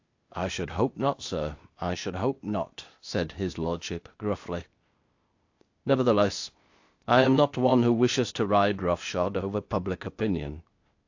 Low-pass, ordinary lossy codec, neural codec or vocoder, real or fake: 7.2 kHz; AAC, 48 kbps; codec, 16 kHz, 0.8 kbps, ZipCodec; fake